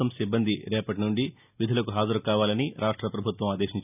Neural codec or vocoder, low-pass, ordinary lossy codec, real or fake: none; 3.6 kHz; none; real